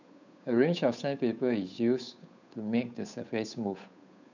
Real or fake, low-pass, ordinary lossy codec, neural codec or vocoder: fake; 7.2 kHz; none; codec, 16 kHz, 8 kbps, FunCodec, trained on Chinese and English, 25 frames a second